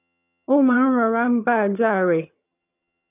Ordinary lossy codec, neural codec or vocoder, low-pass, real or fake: none; vocoder, 22.05 kHz, 80 mel bands, HiFi-GAN; 3.6 kHz; fake